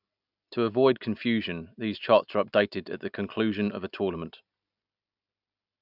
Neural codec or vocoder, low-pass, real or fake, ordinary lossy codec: none; 5.4 kHz; real; none